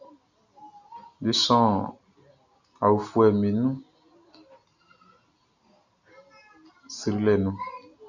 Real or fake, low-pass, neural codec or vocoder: real; 7.2 kHz; none